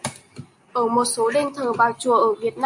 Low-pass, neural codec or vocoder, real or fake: 10.8 kHz; none; real